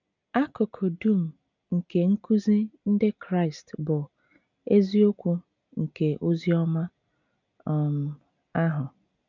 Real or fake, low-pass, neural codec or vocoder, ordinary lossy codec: real; 7.2 kHz; none; none